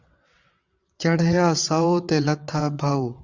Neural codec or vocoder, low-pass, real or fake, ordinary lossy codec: vocoder, 22.05 kHz, 80 mel bands, WaveNeXt; 7.2 kHz; fake; Opus, 64 kbps